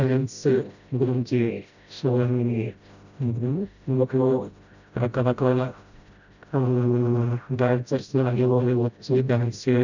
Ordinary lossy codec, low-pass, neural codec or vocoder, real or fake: none; 7.2 kHz; codec, 16 kHz, 0.5 kbps, FreqCodec, smaller model; fake